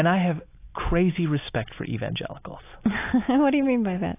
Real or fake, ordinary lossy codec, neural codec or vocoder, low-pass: real; AAC, 24 kbps; none; 3.6 kHz